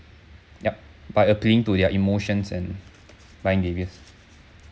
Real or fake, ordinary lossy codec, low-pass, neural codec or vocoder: real; none; none; none